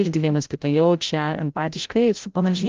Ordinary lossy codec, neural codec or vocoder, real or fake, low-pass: Opus, 32 kbps; codec, 16 kHz, 0.5 kbps, FreqCodec, larger model; fake; 7.2 kHz